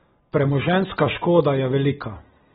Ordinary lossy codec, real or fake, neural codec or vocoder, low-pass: AAC, 16 kbps; real; none; 19.8 kHz